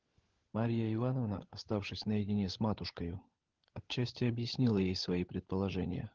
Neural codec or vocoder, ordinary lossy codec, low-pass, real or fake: codec, 16 kHz, 16 kbps, FunCodec, trained on LibriTTS, 50 frames a second; Opus, 16 kbps; 7.2 kHz; fake